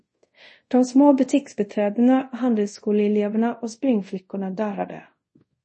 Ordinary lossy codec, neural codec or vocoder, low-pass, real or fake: MP3, 32 kbps; codec, 24 kHz, 0.5 kbps, DualCodec; 10.8 kHz; fake